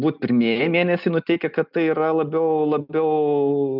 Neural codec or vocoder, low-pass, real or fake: none; 5.4 kHz; real